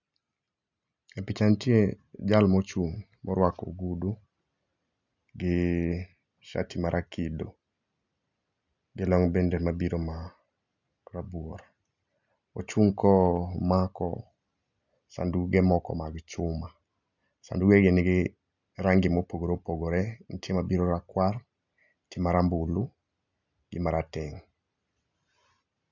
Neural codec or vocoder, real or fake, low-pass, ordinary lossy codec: none; real; 7.2 kHz; none